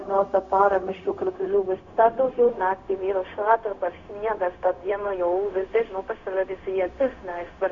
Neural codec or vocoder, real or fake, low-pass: codec, 16 kHz, 0.4 kbps, LongCat-Audio-Codec; fake; 7.2 kHz